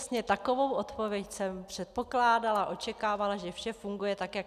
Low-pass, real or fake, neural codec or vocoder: 14.4 kHz; real; none